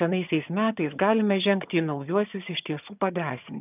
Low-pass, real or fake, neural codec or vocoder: 3.6 kHz; fake; vocoder, 22.05 kHz, 80 mel bands, HiFi-GAN